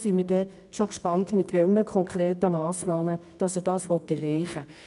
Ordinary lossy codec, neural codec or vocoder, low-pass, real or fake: none; codec, 24 kHz, 0.9 kbps, WavTokenizer, medium music audio release; 10.8 kHz; fake